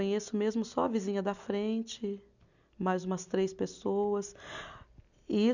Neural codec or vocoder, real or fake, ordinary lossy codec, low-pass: none; real; none; 7.2 kHz